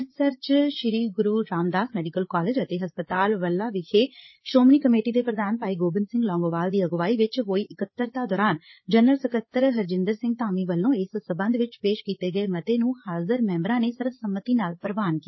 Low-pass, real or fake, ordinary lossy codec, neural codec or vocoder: 7.2 kHz; fake; MP3, 24 kbps; codec, 16 kHz, 8 kbps, FreqCodec, larger model